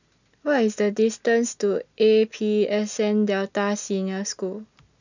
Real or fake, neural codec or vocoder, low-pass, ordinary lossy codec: real; none; 7.2 kHz; none